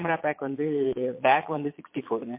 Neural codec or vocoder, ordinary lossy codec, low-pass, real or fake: vocoder, 22.05 kHz, 80 mel bands, Vocos; MP3, 24 kbps; 3.6 kHz; fake